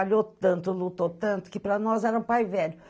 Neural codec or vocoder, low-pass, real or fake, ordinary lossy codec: none; none; real; none